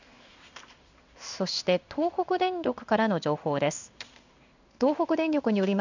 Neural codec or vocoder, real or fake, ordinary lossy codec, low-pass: codec, 16 kHz in and 24 kHz out, 1 kbps, XY-Tokenizer; fake; none; 7.2 kHz